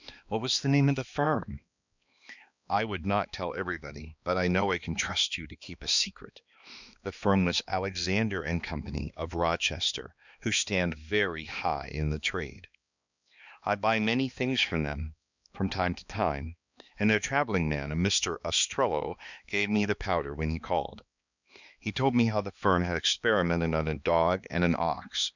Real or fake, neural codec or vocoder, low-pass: fake; codec, 16 kHz, 2 kbps, X-Codec, HuBERT features, trained on balanced general audio; 7.2 kHz